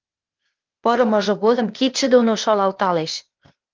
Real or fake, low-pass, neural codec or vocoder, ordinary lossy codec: fake; 7.2 kHz; codec, 16 kHz, 0.8 kbps, ZipCodec; Opus, 24 kbps